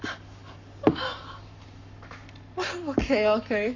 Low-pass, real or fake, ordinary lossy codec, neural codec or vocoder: 7.2 kHz; fake; none; codec, 44.1 kHz, 7.8 kbps, Pupu-Codec